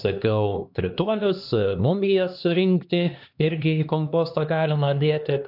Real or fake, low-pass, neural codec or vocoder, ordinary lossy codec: fake; 5.4 kHz; codec, 16 kHz, 2 kbps, X-Codec, HuBERT features, trained on LibriSpeech; AAC, 48 kbps